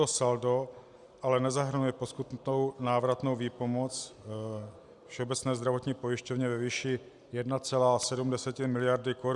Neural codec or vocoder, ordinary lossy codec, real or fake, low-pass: none; Opus, 64 kbps; real; 10.8 kHz